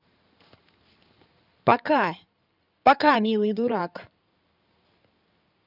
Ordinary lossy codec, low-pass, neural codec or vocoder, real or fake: none; 5.4 kHz; codec, 16 kHz in and 24 kHz out, 2.2 kbps, FireRedTTS-2 codec; fake